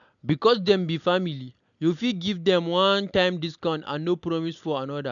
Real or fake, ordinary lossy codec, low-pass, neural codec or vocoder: real; none; 7.2 kHz; none